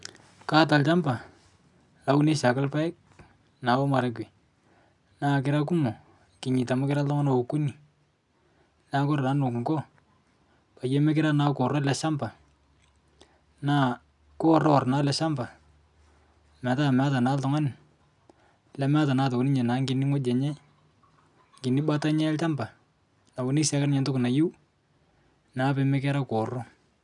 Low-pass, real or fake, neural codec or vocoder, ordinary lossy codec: 10.8 kHz; real; none; none